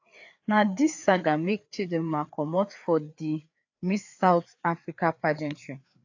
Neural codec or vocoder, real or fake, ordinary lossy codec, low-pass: codec, 16 kHz, 4 kbps, FreqCodec, larger model; fake; AAC, 48 kbps; 7.2 kHz